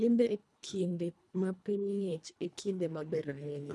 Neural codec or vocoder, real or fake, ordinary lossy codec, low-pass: codec, 24 kHz, 1.5 kbps, HILCodec; fake; none; none